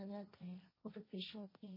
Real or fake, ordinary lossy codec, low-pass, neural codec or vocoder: fake; MP3, 24 kbps; 5.4 kHz; codec, 16 kHz, 1.1 kbps, Voila-Tokenizer